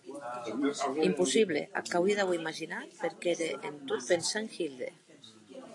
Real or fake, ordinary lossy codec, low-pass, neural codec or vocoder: real; AAC, 48 kbps; 10.8 kHz; none